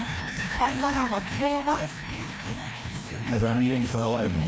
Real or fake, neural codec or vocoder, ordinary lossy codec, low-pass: fake; codec, 16 kHz, 1 kbps, FreqCodec, larger model; none; none